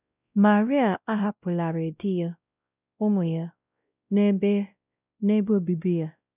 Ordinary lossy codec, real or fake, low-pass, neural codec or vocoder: none; fake; 3.6 kHz; codec, 16 kHz, 0.5 kbps, X-Codec, WavLM features, trained on Multilingual LibriSpeech